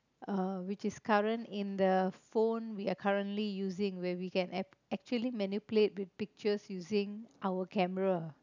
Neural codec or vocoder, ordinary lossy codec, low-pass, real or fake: none; none; 7.2 kHz; real